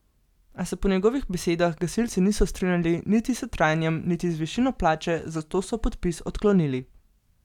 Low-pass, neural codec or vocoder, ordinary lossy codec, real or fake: 19.8 kHz; none; none; real